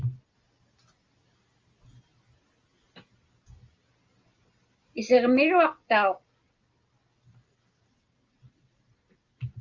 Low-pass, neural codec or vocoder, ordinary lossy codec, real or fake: 7.2 kHz; none; Opus, 32 kbps; real